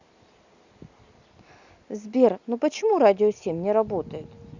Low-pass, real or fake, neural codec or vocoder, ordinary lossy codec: 7.2 kHz; real; none; Opus, 64 kbps